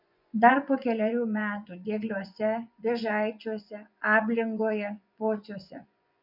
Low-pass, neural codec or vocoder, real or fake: 5.4 kHz; vocoder, 24 kHz, 100 mel bands, Vocos; fake